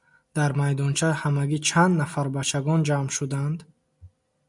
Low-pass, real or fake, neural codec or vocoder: 10.8 kHz; real; none